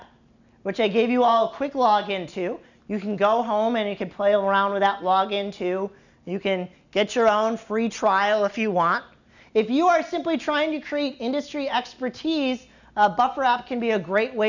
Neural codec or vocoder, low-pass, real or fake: none; 7.2 kHz; real